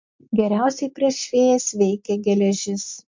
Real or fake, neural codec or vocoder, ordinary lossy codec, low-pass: real; none; MP3, 48 kbps; 7.2 kHz